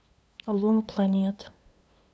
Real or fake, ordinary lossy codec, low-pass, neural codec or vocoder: fake; none; none; codec, 16 kHz, 2 kbps, FunCodec, trained on LibriTTS, 25 frames a second